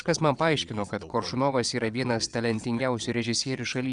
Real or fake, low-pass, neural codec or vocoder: fake; 9.9 kHz; vocoder, 22.05 kHz, 80 mel bands, WaveNeXt